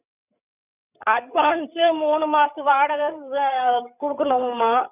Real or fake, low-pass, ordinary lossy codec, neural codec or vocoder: fake; 3.6 kHz; none; codec, 16 kHz, 8 kbps, FreqCodec, larger model